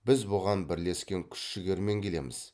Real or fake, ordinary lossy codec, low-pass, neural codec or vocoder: real; none; none; none